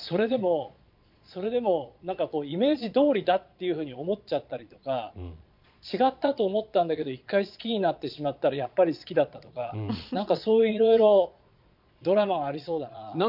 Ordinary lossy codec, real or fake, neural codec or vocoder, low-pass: none; fake; vocoder, 22.05 kHz, 80 mel bands, Vocos; 5.4 kHz